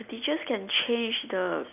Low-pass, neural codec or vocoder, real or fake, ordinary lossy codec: 3.6 kHz; none; real; none